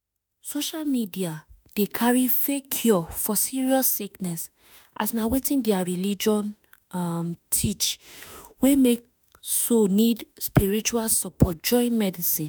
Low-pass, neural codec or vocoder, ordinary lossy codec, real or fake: none; autoencoder, 48 kHz, 32 numbers a frame, DAC-VAE, trained on Japanese speech; none; fake